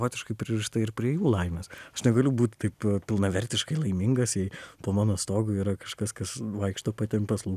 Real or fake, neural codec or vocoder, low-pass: real; none; 14.4 kHz